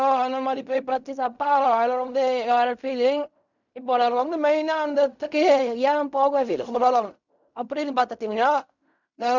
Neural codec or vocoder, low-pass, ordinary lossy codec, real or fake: codec, 16 kHz in and 24 kHz out, 0.4 kbps, LongCat-Audio-Codec, fine tuned four codebook decoder; 7.2 kHz; none; fake